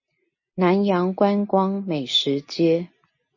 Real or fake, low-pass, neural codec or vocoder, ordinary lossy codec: real; 7.2 kHz; none; MP3, 32 kbps